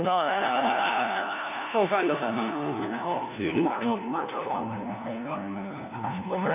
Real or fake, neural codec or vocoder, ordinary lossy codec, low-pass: fake; codec, 16 kHz, 1 kbps, FunCodec, trained on LibriTTS, 50 frames a second; none; 3.6 kHz